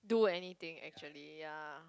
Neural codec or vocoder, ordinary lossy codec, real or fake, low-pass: none; none; real; none